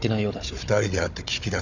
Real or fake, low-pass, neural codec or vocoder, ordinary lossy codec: fake; 7.2 kHz; codec, 16 kHz, 16 kbps, FunCodec, trained on Chinese and English, 50 frames a second; none